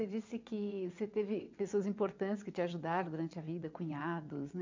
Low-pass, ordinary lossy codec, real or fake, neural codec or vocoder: 7.2 kHz; MP3, 64 kbps; fake; vocoder, 22.05 kHz, 80 mel bands, WaveNeXt